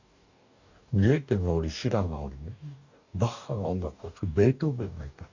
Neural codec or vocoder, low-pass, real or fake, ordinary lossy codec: codec, 44.1 kHz, 2.6 kbps, DAC; 7.2 kHz; fake; none